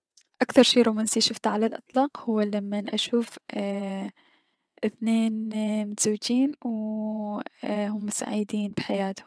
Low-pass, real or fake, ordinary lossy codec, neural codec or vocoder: none; fake; none; vocoder, 22.05 kHz, 80 mel bands, WaveNeXt